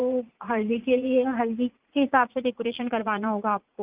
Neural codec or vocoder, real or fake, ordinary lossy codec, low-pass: vocoder, 44.1 kHz, 80 mel bands, Vocos; fake; Opus, 24 kbps; 3.6 kHz